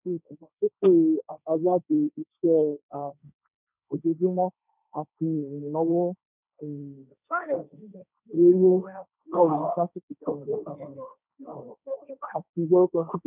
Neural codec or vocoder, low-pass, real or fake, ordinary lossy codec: codec, 16 kHz, 1.1 kbps, Voila-Tokenizer; 3.6 kHz; fake; none